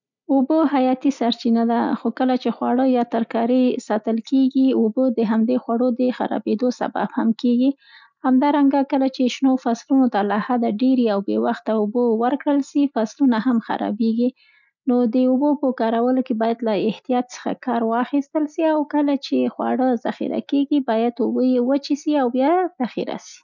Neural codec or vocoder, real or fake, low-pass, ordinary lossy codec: none; real; 7.2 kHz; none